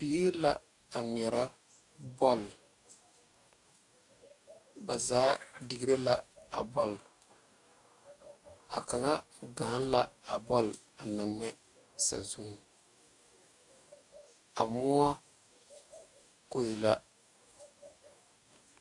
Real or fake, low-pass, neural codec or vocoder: fake; 10.8 kHz; codec, 44.1 kHz, 2.6 kbps, DAC